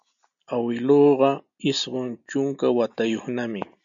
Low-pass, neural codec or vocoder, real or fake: 7.2 kHz; none; real